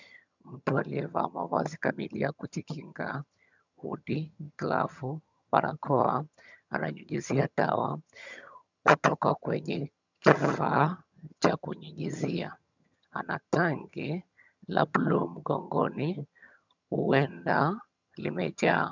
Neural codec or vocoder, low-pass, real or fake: vocoder, 22.05 kHz, 80 mel bands, HiFi-GAN; 7.2 kHz; fake